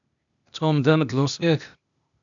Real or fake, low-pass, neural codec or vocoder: fake; 7.2 kHz; codec, 16 kHz, 0.8 kbps, ZipCodec